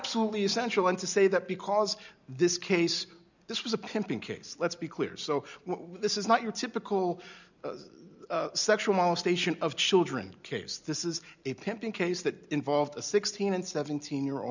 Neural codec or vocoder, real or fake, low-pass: none; real; 7.2 kHz